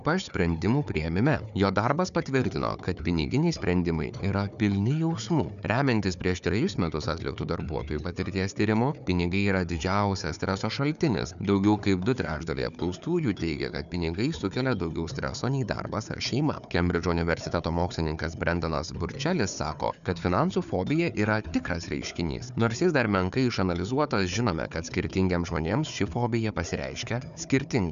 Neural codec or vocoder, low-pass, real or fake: codec, 16 kHz, 4 kbps, FunCodec, trained on Chinese and English, 50 frames a second; 7.2 kHz; fake